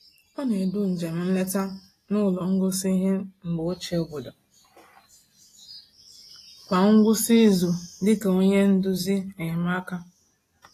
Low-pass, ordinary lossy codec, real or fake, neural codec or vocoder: 14.4 kHz; AAC, 48 kbps; real; none